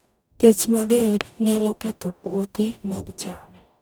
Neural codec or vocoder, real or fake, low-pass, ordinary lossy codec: codec, 44.1 kHz, 0.9 kbps, DAC; fake; none; none